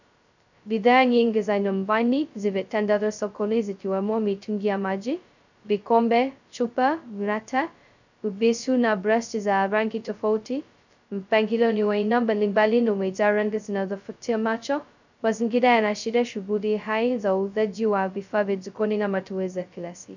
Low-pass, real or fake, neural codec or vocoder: 7.2 kHz; fake; codec, 16 kHz, 0.2 kbps, FocalCodec